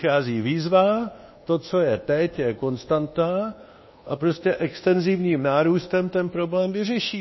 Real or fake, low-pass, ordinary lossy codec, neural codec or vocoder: fake; 7.2 kHz; MP3, 24 kbps; codec, 24 kHz, 1.2 kbps, DualCodec